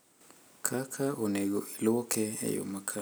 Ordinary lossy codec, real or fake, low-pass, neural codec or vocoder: none; real; none; none